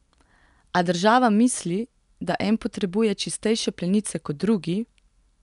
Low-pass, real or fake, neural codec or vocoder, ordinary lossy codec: 10.8 kHz; real; none; none